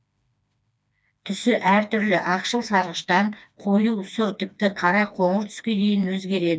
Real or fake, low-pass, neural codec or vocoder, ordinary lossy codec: fake; none; codec, 16 kHz, 2 kbps, FreqCodec, smaller model; none